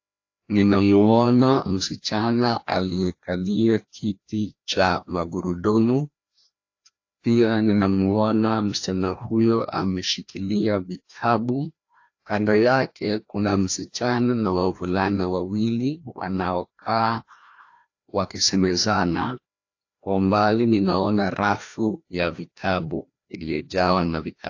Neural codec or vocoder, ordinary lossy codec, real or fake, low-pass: codec, 16 kHz, 1 kbps, FreqCodec, larger model; AAC, 48 kbps; fake; 7.2 kHz